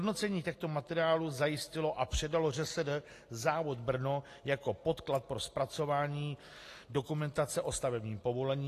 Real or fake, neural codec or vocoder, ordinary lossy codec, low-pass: real; none; AAC, 48 kbps; 14.4 kHz